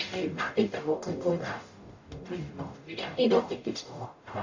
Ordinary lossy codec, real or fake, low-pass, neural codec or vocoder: AAC, 48 kbps; fake; 7.2 kHz; codec, 44.1 kHz, 0.9 kbps, DAC